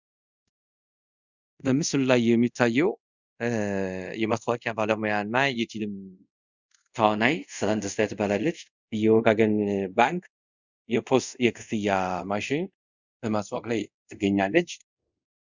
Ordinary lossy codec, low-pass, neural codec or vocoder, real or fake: Opus, 64 kbps; 7.2 kHz; codec, 24 kHz, 0.5 kbps, DualCodec; fake